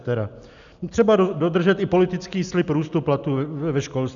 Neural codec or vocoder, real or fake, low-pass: none; real; 7.2 kHz